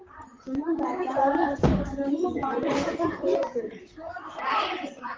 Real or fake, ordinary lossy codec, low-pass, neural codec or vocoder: fake; Opus, 32 kbps; 7.2 kHz; vocoder, 44.1 kHz, 128 mel bands, Pupu-Vocoder